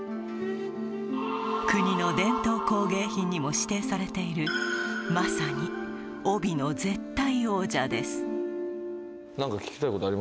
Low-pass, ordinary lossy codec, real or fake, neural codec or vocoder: none; none; real; none